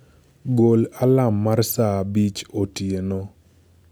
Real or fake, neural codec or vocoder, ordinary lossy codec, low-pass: real; none; none; none